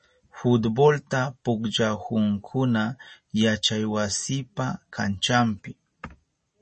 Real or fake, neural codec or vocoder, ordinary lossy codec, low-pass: real; none; MP3, 32 kbps; 10.8 kHz